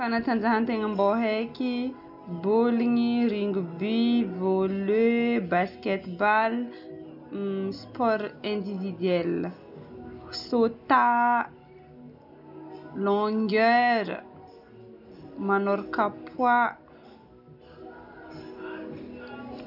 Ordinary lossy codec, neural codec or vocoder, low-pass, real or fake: none; none; 5.4 kHz; real